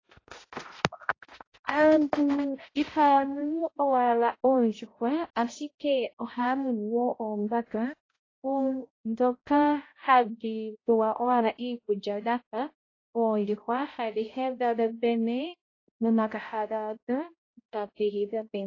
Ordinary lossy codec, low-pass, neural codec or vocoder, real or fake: AAC, 32 kbps; 7.2 kHz; codec, 16 kHz, 0.5 kbps, X-Codec, HuBERT features, trained on balanced general audio; fake